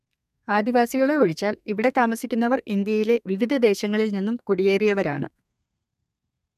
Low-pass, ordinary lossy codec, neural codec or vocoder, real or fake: 14.4 kHz; none; codec, 32 kHz, 1.9 kbps, SNAC; fake